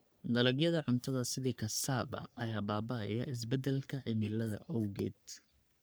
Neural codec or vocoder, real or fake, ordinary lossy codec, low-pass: codec, 44.1 kHz, 3.4 kbps, Pupu-Codec; fake; none; none